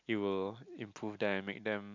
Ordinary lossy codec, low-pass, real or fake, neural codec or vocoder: none; 7.2 kHz; real; none